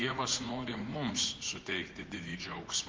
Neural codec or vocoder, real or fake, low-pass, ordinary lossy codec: vocoder, 44.1 kHz, 80 mel bands, Vocos; fake; 7.2 kHz; Opus, 16 kbps